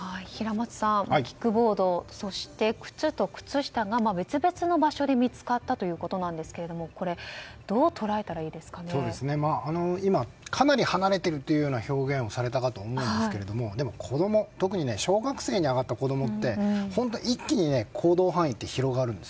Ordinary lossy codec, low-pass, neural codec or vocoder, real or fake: none; none; none; real